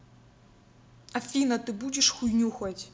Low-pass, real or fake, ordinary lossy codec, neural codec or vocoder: none; real; none; none